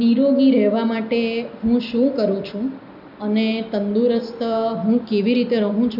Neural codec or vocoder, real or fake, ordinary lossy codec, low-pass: none; real; none; 5.4 kHz